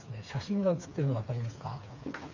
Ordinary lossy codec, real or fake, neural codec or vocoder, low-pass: none; fake; codec, 16 kHz, 4 kbps, FreqCodec, smaller model; 7.2 kHz